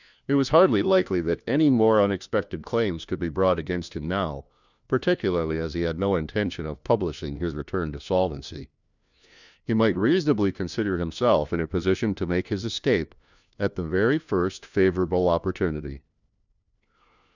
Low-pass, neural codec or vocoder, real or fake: 7.2 kHz; codec, 16 kHz, 1 kbps, FunCodec, trained on LibriTTS, 50 frames a second; fake